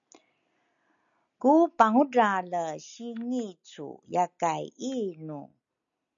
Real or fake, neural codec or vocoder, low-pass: real; none; 7.2 kHz